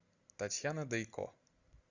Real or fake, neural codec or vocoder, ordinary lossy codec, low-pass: real; none; Opus, 64 kbps; 7.2 kHz